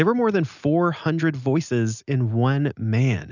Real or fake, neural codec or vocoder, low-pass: real; none; 7.2 kHz